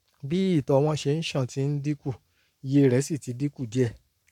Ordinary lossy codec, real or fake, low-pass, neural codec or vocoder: none; fake; 19.8 kHz; codec, 44.1 kHz, 7.8 kbps, Pupu-Codec